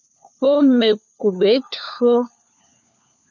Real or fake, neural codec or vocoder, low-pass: fake; codec, 16 kHz, 4 kbps, FunCodec, trained on LibriTTS, 50 frames a second; 7.2 kHz